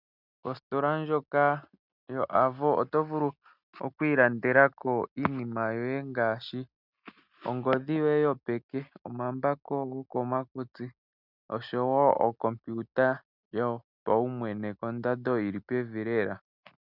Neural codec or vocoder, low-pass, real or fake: none; 5.4 kHz; real